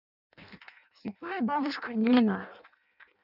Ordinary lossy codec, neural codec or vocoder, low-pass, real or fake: none; codec, 16 kHz in and 24 kHz out, 0.6 kbps, FireRedTTS-2 codec; 5.4 kHz; fake